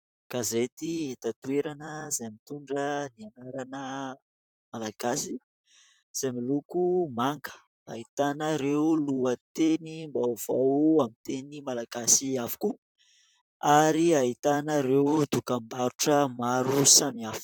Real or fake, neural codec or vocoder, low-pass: fake; vocoder, 44.1 kHz, 128 mel bands, Pupu-Vocoder; 19.8 kHz